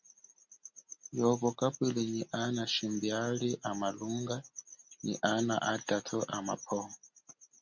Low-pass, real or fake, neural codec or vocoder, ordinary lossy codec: 7.2 kHz; real; none; Opus, 64 kbps